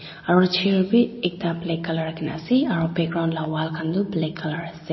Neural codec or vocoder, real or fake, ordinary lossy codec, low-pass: none; real; MP3, 24 kbps; 7.2 kHz